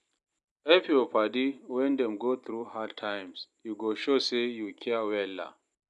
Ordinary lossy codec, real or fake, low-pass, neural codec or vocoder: none; real; 10.8 kHz; none